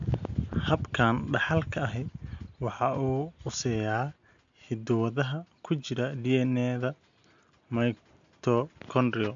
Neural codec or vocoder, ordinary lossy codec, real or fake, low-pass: none; AAC, 64 kbps; real; 7.2 kHz